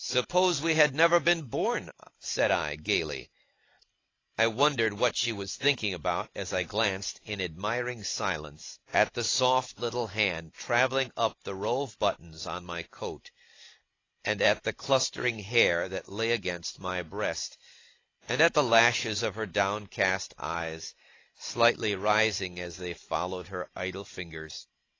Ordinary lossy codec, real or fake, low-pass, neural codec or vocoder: AAC, 32 kbps; real; 7.2 kHz; none